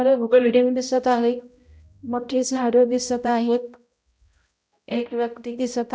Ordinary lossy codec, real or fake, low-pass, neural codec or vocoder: none; fake; none; codec, 16 kHz, 0.5 kbps, X-Codec, HuBERT features, trained on balanced general audio